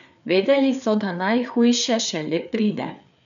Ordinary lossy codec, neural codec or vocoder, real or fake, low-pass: none; codec, 16 kHz, 4 kbps, FreqCodec, larger model; fake; 7.2 kHz